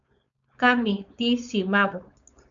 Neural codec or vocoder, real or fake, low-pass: codec, 16 kHz, 4.8 kbps, FACodec; fake; 7.2 kHz